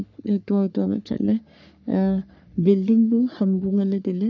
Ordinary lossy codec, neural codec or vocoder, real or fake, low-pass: none; codec, 44.1 kHz, 3.4 kbps, Pupu-Codec; fake; 7.2 kHz